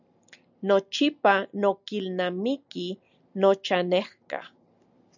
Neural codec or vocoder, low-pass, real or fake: none; 7.2 kHz; real